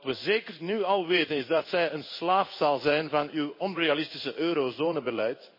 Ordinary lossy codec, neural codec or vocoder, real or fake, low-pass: MP3, 24 kbps; codec, 16 kHz in and 24 kHz out, 1 kbps, XY-Tokenizer; fake; 5.4 kHz